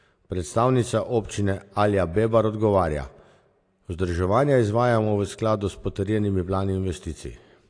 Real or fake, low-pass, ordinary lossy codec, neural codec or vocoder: real; 9.9 kHz; AAC, 48 kbps; none